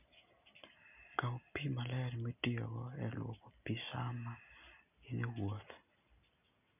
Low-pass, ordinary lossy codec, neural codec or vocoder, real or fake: 3.6 kHz; none; none; real